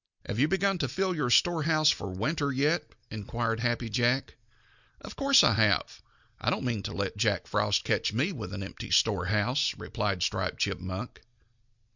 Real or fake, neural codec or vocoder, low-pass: real; none; 7.2 kHz